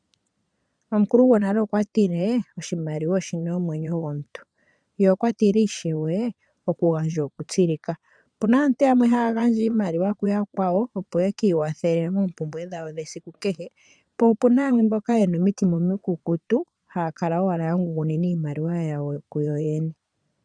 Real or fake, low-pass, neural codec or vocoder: fake; 9.9 kHz; vocoder, 22.05 kHz, 80 mel bands, Vocos